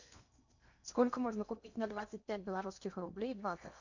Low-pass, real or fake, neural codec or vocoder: 7.2 kHz; fake; codec, 16 kHz in and 24 kHz out, 0.8 kbps, FocalCodec, streaming, 65536 codes